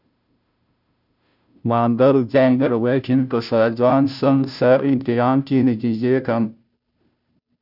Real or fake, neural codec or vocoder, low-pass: fake; codec, 16 kHz, 0.5 kbps, FunCodec, trained on Chinese and English, 25 frames a second; 5.4 kHz